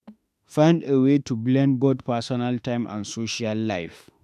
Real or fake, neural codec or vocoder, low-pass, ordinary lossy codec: fake; autoencoder, 48 kHz, 32 numbers a frame, DAC-VAE, trained on Japanese speech; 14.4 kHz; none